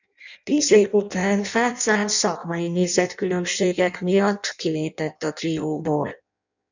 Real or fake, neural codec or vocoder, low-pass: fake; codec, 16 kHz in and 24 kHz out, 0.6 kbps, FireRedTTS-2 codec; 7.2 kHz